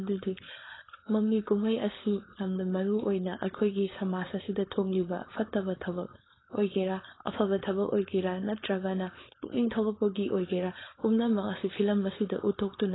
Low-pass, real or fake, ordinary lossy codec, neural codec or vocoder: 7.2 kHz; fake; AAC, 16 kbps; codec, 16 kHz, 4.8 kbps, FACodec